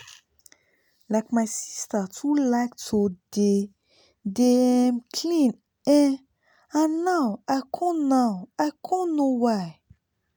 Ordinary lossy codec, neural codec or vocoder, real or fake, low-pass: none; none; real; none